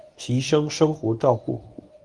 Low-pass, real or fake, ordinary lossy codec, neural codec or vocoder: 9.9 kHz; fake; Opus, 32 kbps; codec, 24 kHz, 0.9 kbps, WavTokenizer, medium speech release version 1